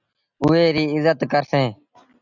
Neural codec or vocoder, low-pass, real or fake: none; 7.2 kHz; real